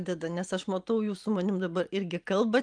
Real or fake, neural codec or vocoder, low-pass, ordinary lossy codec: fake; vocoder, 44.1 kHz, 128 mel bands every 512 samples, BigVGAN v2; 9.9 kHz; Opus, 32 kbps